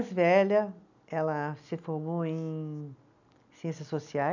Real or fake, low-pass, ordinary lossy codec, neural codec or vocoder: real; 7.2 kHz; none; none